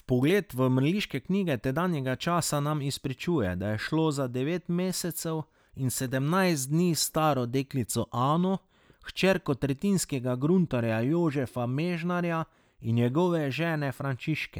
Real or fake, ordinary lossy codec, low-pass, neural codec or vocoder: real; none; none; none